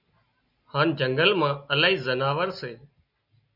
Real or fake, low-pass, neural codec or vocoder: real; 5.4 kHz; none